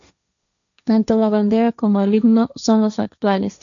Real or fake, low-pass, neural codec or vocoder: fake; 7.2 kHz; codec, 16 kHz, 1.1 kbps, Voila-Tokenizer